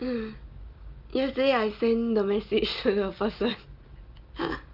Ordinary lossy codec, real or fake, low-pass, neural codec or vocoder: Opus, 32 kbps; real; 5.4 kHz; none